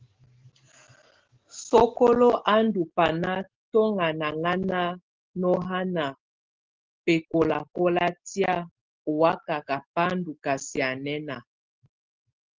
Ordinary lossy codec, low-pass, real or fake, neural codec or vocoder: Opus, 16 kbps; 7.2 kHz; real; none